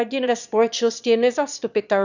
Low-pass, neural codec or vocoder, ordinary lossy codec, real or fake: 7.2 kHz; autoencoder, 22.05 kHz, a latent of 192 numbers a frame, VITS, trained on one speaker; none; fake